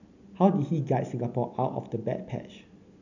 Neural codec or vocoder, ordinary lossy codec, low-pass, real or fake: none; none; 7.2 kHz; real